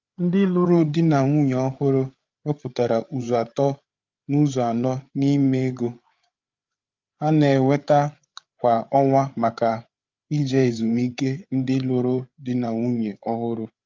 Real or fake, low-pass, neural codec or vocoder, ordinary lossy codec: fake; 7.2 kHz; codec, 16 kHz, 8 kbps, FreqCodec, larger model; Opus, 24 kbps